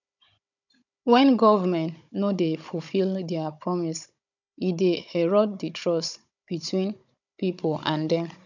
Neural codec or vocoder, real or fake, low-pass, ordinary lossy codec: codec, 16 kHz, 16 kbps, FunCodec, trained on Chinese and English, 50 frames a second; fake; 7.2 kHz; none